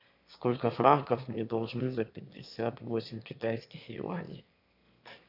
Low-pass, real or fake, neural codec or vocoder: 5.4 kHz; fake; autoencoder, 22.05 kHz, a latent of 192 numbers a frame, VITS, trained on one speaker